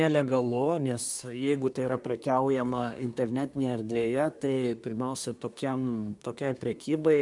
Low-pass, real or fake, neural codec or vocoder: 10.8 kHz; fake; codec, 24 kHz, 1 kbps, SNAC